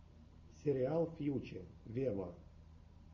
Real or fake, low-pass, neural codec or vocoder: real; 7.2 kHz; none